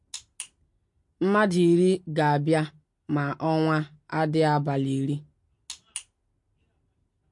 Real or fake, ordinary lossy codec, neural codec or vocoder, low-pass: real; MP3, 48 kbps; none; 10.8 kHz